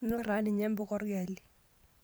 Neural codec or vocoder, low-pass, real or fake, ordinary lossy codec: vocoder, 44.1 kHz, 128 mel bands every 512 samples, BigVGAN v2; none; fake; none